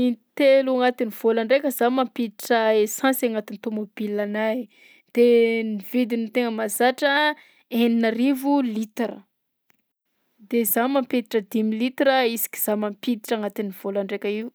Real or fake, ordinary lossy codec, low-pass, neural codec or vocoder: real; none; none; none